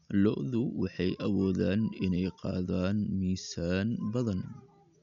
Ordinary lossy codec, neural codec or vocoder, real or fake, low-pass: none; none; real; 7.2 kHz